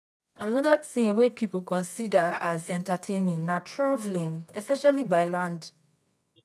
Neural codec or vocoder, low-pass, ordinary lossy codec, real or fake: codec, 24 kHz, 0.9 kbps, WavTokenizer, medium music audio release; none; none; fake